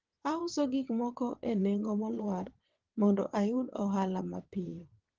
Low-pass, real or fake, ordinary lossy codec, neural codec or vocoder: 7.2 kHz; fake; Opus, 16 kbps; vocoder, 22.05 kHz, 80 mel bands, WaveNeXt